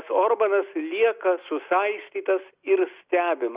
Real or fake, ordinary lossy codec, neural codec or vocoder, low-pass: real; Opus, 64 kbps; none; 3.6 kHz